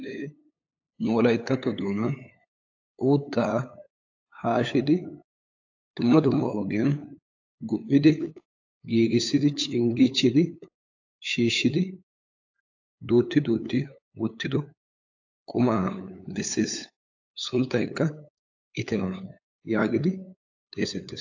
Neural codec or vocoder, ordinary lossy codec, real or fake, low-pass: codec, 16 kHz, 8 kbps, FunCodec, trained on LibriTTS, 25 frames a second; AAC, 48 kbps; fake; 7.2 kHz